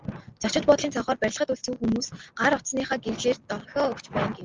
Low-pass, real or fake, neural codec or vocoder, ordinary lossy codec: 7.2 kHz; real; none; Opus, 32 kbps